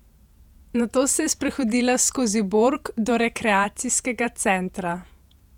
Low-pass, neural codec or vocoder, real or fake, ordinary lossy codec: 19.8 kHz; vocoder, 48 kHz, 128 mel bands, Vocos; fake; none